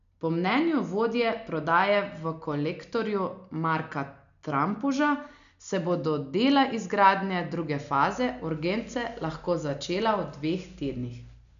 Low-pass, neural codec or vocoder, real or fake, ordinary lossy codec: 7.2 kHz; none; real; none